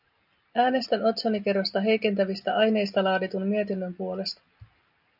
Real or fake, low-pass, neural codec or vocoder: real; 5.4 kHz; none